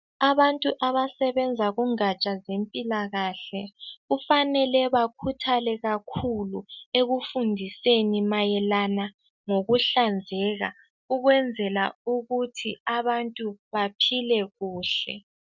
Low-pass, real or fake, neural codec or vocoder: 7.2 kHz; real; none